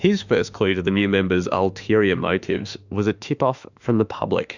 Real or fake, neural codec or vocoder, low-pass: fake; autoencoder, 48 kHz, 32 numbers a frame, DAC-VAE, trained on Japanese speech; 7.2 kHz